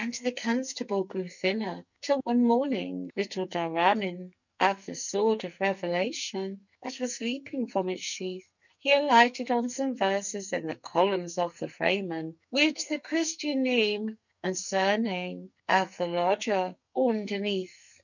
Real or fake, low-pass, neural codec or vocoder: fake; 7.2 kHz; codec, 44.1 kHz, 2.6 kbps, SNAC